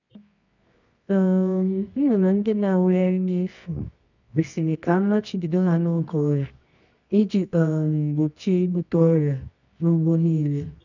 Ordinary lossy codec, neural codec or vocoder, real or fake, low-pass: none; codec, 24 kHz, 0.9 kbps, WavTokenizer, medium music audio release; fake; 7.2 kHz